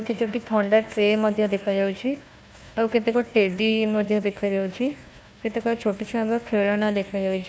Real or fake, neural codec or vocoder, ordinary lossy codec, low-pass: fake; codec, 16 kHz, 1 kbps, FunCodec, trained on Chinese and English, 50 frames a second; none; none